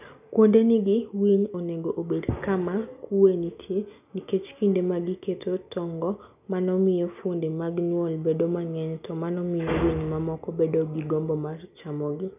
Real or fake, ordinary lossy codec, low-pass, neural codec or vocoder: real; none; 3.6 kHz; none